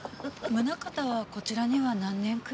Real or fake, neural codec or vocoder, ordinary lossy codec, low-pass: real; none; none; none